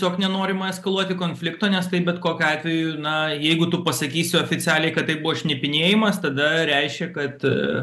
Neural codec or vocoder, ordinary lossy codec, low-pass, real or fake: none; AAC, 96 kbps; 14.4 kHz; real